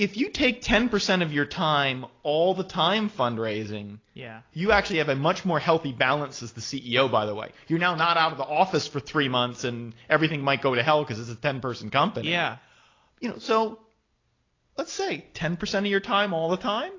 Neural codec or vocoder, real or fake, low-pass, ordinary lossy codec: none; real; 7.2 kHz; AAC, 32 kbps